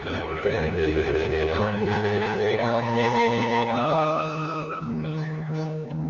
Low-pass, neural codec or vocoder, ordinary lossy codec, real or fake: 7.2 kHz; codec, 16 kHz, 1 kbps, FunCodec, trained on LibriTTS, 50 frames a second; none; fake